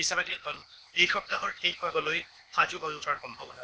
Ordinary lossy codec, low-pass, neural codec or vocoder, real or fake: none; none; codec, 16 kHz, 0.8 kbps, ZipCodec; fake